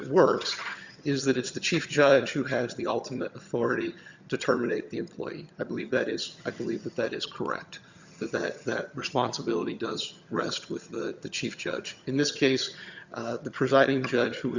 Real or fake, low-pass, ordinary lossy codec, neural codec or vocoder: fake; 7.2 kHz; Opus, 64 kbps; vocoder, 22.05 kHz, 80 mel bands, HiFi-GAN